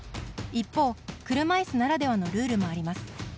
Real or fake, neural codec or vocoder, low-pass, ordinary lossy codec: real; none; none; none